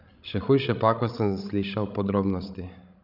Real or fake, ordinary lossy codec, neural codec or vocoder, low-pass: fake; none; codec, 16 kHz, 16 kbps, FreqCodec, larger model; 5.4 kHz